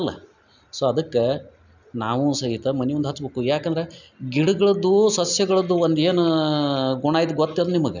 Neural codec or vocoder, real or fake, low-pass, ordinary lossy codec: none; real; 7.2 kHz; none